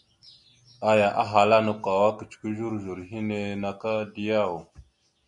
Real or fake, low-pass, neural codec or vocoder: real; 10.8 kHz; none